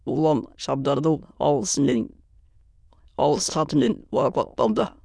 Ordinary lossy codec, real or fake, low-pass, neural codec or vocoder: none; fake; none; autoencoder, 22.05 kHz, a latent of 192 numbers a frame, VITS, trained on many speakers